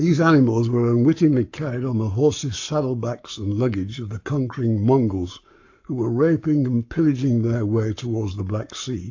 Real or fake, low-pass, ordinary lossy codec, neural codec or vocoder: fake; 7.2 kHz; AAC, 48 kbps; codec, 44.1 kHz, 7.8 kbps, Pupu-Codec